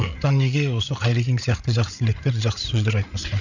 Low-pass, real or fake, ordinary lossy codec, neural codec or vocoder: 7.2 kHz; fake; none; codec, 16 kHz, 8 kbps, FreqCodec, larger model